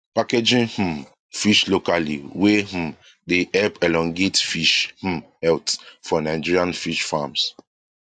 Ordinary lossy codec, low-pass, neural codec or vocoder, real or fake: AAC, 64 kbps; 9.9 kHz; none; real